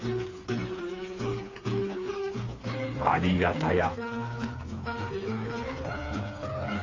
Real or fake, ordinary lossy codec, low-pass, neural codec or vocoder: fake; AAC, 32 kbps; 7.2 kHz; codec, 16 kHz, 4 kbps, FreqCodec, smaller model